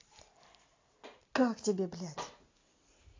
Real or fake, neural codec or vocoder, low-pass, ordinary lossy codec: real; none; 7.2 kHz; AAC, 32 kbps